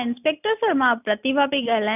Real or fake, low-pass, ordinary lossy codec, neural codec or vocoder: real; 3.6 kHz; none; none